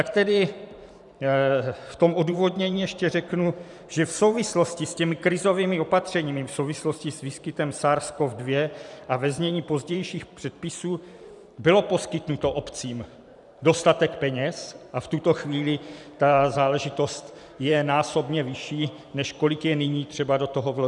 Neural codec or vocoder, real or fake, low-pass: vocoder, 44.1 kHz, 128 mel bands every 512 samples, BigVGAN v2; fake; 10.8 kHz